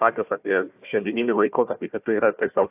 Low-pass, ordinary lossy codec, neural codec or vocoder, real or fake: 3.6 kHz; AAC, 32 kbps; codec, 16 kHz, 1 kbps, FunCodec, trained on Chinese and English, 50 frames a second; fake